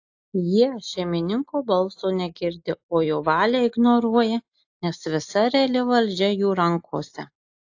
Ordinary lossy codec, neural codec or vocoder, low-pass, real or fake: AAC, 48 kbps; none; 7.2 kHz; real